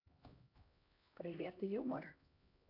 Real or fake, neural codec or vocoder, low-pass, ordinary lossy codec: fake; codec, 16 kHz, 1 kbps, X-Codec, HuBERT features, trained on LibriSpeech; 5.4 kHz; AAC, 32 kbps